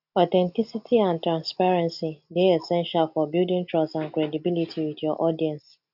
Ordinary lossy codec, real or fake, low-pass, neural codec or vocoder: none; real; 5.4 kHz; none